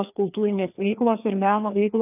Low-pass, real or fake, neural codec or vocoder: 3.6 kHz; fake; codec, 16 kHz, 2 kbps, FreqCodec, larger model